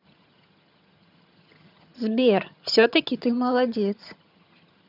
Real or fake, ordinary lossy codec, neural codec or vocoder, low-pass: fake; none; vocoder, 22.05 kHz, 80 mel bands, HiFi-GAN; 5.4 kHz